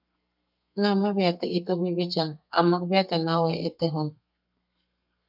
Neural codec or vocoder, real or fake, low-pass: codec, 32 kHz, 1.9 kbps, SNAC; fake; 5.4 kHz